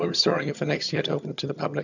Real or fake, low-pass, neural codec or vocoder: fake; 7.2 kHz; vocoder, 22.05 kHz, 80 mel bands, HiFi-GAN